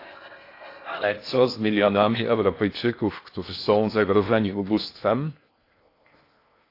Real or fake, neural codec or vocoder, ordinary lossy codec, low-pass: fake; codec, 16 kHz in and 24 kHz out, 0.6 kbps, FocalCodec, streaming, 2048 codes; AAC, 32 kbps; 5.4 kHz